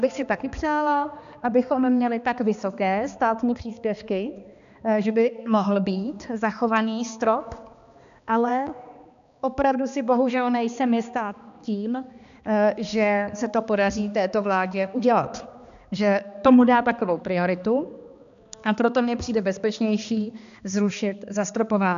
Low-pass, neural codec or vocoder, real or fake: 7.2 kHz; codec, 16 kHz, 2 kbps, X-Codec, HuBERT features, trained on balanced general audio; fake